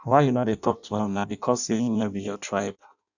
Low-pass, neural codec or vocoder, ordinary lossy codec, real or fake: 7.2 kHz; codec, 16 kHz in and 24 kHz out, 0.6 kbps, FireRedTTS-2 codec; none; fake